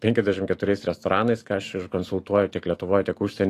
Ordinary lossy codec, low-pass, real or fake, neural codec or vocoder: AAC, 48 kbps; 14.4 kHz; fake; autoencoder, 48 kHz, 128 numbers a frame, DAC-VAE, trained on Japanese speech